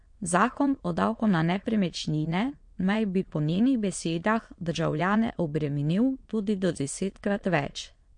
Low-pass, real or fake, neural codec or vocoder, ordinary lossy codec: 9.9 kHz; fake; autoencoder, 22.05 kHz, a latent of 192 numbers a frame, VITS, trained on many speakers; MP3, 48 kbps